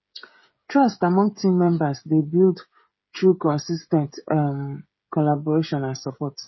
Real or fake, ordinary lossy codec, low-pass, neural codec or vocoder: fake; MP3, 24 kbps; 7.2 kHz; codec, 16 kHz, 16 kbps, FreqCodec, smaller model